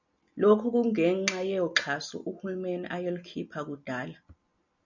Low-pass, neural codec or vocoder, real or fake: 7.2 kHz; none; real